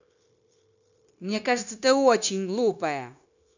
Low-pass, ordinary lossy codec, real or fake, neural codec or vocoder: 7.2 kHz; none; fake; codec, 16 kHz, 0.9 kbps, LongCat-Audio-Codec